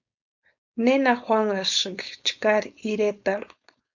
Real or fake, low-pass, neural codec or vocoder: fake; 7.2 kHz; codec, 16 kHz, 4.8 kbps, FACodec